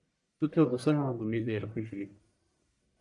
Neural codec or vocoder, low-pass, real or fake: codec, 44.1 kHz, 1.7 kbps, Pupu-Codec; 10.8 kHz; fake